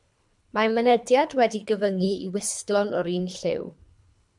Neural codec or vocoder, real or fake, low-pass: codec, 24 kHz, 3 kbps, HILCodec; fake; 10.8 kHz